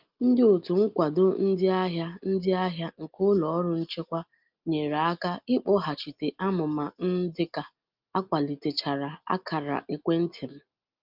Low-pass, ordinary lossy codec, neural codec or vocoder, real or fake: 5.4 kHz; Opus, 24 kbps; none; real